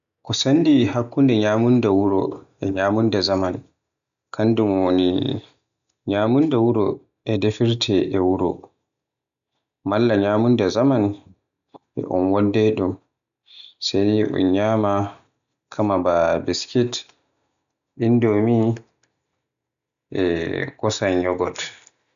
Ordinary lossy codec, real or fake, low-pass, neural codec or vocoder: none; real; 7.2 kHz; none